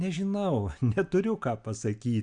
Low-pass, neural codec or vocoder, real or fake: 9.9 kHz; none; real